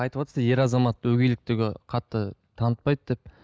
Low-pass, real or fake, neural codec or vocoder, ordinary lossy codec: none; real; none; none